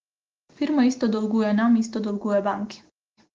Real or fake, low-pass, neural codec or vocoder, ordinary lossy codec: real; 7.2 kHz; none; Opus, 16 kbps